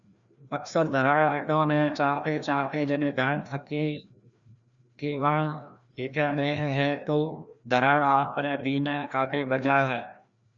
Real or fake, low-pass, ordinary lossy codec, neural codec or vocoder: fake; 7.2 kHz; Opus, 64 kbps; codec, 16 kHz, 1 kbps, FreqCodec, larger model